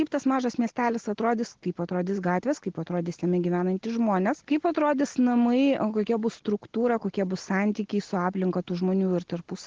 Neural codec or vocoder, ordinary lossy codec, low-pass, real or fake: none; Opus, 16 kbps; 7.2 kHz; real